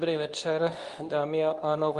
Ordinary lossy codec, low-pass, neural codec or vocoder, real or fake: Opus, 32 kbps; 10.8 kHz; codec, 24 kHz, 0.9 kbps, WavTokenizer, medium speech release version 2; fake